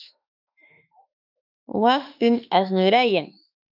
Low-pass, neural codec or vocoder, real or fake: 5.4 kHz; autoencoder, 48 kHz, 32 numbers a frame, DAC-VAE, trained on Japanese speech; fake